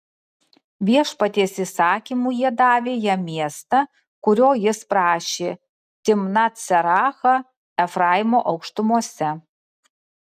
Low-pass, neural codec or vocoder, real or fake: 14.4 kHz; none; real